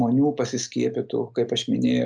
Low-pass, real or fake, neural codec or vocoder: 9.9 kHz; real; none